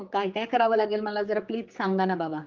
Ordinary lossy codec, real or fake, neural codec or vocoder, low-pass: Opus, 32 kbps; fake; codec, 16 kHz, 4 kbps, X-Codec, HuBERT features, trained on general audio; 7.2 kHz